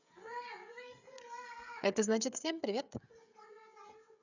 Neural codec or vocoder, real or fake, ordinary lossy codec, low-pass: codec, 16 kHz, 16 kbps, FreqCodec, smaller model; fake; none; 7.2 kHz